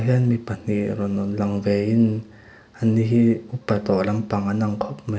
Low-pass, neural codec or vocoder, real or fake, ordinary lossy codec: none; none; real; none